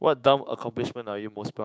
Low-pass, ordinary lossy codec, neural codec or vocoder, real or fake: none; none; codec, 16 kHz, 6 kbps, DAC; fake